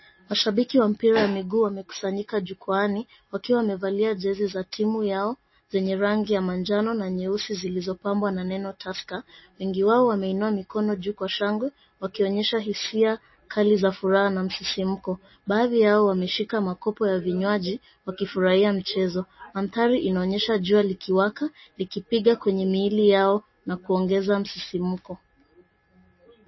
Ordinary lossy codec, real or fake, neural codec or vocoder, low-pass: MP3, 24 kbps; real; none; 7.2 kHz